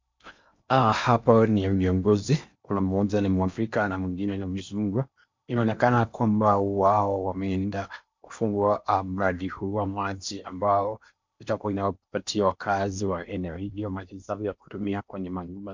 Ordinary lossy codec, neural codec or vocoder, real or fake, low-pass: MP3, 64 kbps; codec, 16 kHz in and 24 kHz out, 0.8 kbps, FocalCodec, streaming, 65536 codes; fake; 7.2 kHz